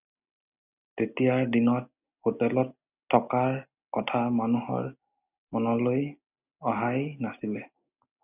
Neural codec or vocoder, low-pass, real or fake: none; 3.6 kHz; real